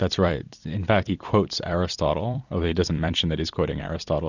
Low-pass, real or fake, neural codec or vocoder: 7.2 kHz; fake; vocoder, 44.1 kHz, 128 mel bands every 256 samples, BigVGAN v2